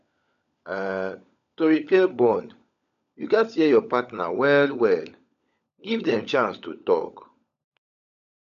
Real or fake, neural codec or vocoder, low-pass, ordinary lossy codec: fake; codec, 16 kHz, 16 kbps, FunCodec, trained on LibriTTS, 50 frames a second; 7.2 kHz; none